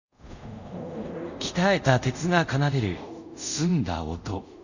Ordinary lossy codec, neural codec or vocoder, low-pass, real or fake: none; codec, 24 kHz, 0.5 kbps, DualCodec; 7.2 kHz; fake